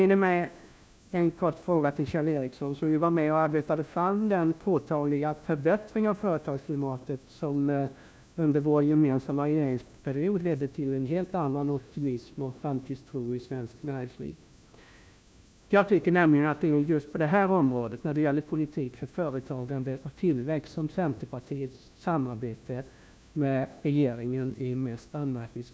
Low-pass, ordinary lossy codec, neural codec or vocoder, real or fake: none; none; codec, 16 kHz, 1 kbps, FunCodec, trained on LibriTTS, 50 frames a second; fake